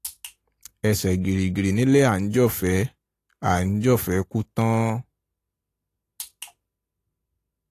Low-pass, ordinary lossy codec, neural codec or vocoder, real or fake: 14.4 kHz; AAC, 48 kbps; none; real